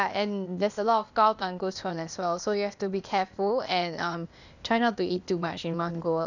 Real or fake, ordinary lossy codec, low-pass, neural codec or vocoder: fake; none; 7.2 kHz; codec, 16 kHz, 0.8 kbps, ZipCodec